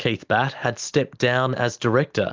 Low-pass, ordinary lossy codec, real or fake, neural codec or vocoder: 7.2 kHz; Opus, 32 kbps; real; none